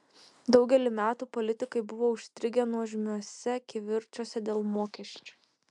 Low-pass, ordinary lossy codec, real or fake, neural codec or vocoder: 10.8 kHz; MP3, 96 kbps; real; none